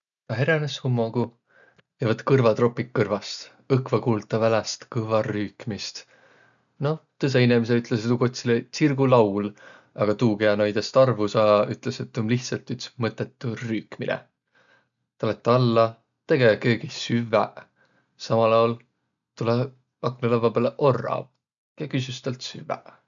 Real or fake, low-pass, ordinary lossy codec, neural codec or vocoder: real; 7.2 kHz; none; none